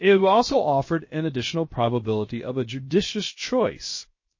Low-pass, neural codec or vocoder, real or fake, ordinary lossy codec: 7.2 kHz; codec, 16 kHz, 0.7 kbps, FocalCodec; fake; MP3, 32 kbps